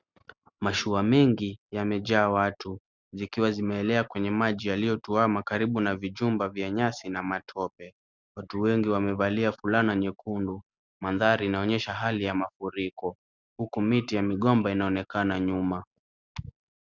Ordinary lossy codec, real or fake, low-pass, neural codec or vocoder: Opus, 64 kbps; real; 7.2 kHz; none